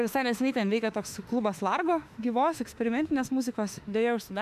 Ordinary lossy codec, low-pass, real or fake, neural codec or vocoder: AAC, 96 kbps; 14.4 kHz; fake; autoencoder, 48 kHz, 32 numbers a frame, DAC-VAE, trained on Japanese speech